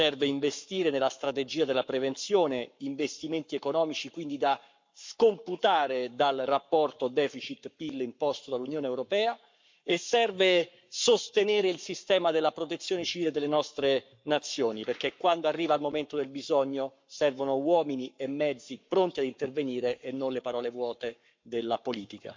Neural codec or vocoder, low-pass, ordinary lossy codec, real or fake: codec, 44.1 kHz, 7.8 kbps, Pupu-Codec; 7.2 kHz; MP3, 64 kbps; fake